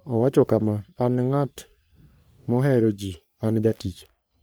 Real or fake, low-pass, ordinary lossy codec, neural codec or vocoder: fake; none; none; codec, 44.1 kHz, 3.4 kbps, Pupu-Codec